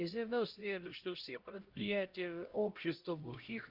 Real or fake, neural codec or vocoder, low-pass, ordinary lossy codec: fake; codec, 16 kHz, 0.5 kbps, X-Codec, HuBERT features, trained on LibriSpeech; 5.4 kHz; Opus, 24 kbps